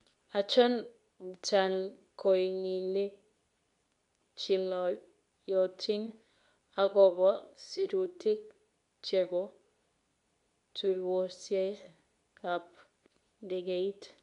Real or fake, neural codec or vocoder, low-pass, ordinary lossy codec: fake; codec, 24 kHz, 0.9 kbps, WavTokenizer, medium speech release version 2; 10.8 kHz; none